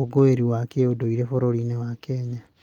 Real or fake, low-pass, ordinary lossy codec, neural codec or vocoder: real; 19.8 kHz; Opus, 32 kbps; none